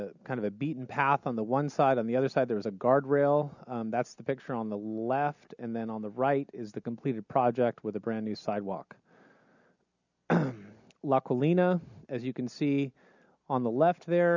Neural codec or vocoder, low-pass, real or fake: none; 7.2 kHz; real